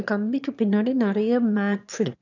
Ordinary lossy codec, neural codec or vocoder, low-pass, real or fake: none; autoencoder, 22.05 kHz, a latent of 192 numbers a frame, VITS, trained on one speaker; 7.2 kHz; fake